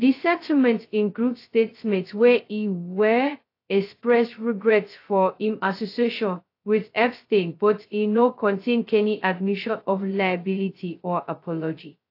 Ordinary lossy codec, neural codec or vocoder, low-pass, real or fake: AAC, 32 kbps; codec, 16 kHz, 0.2 kbps, FocalCodec; 5.4 kHz; fake